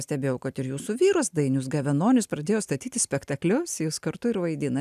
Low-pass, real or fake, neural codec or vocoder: 14.4 kHz; real; none